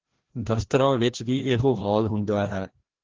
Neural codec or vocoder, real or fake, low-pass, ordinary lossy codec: codec, 16 kHz, 1 kbps, FreqCodec, larger model; fake; 7.2 kHz; Opus, 16 kbps